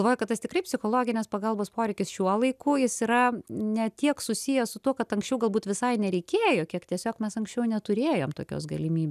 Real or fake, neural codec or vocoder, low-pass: real; none; 14.4 kHz